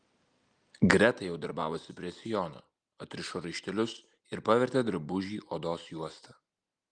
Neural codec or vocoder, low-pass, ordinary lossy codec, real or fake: none; 9.9 kHz; Opus, 32 kbps; real